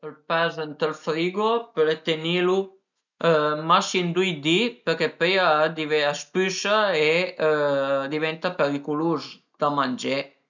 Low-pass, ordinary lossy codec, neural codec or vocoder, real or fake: 7.2 kHz; none; none; real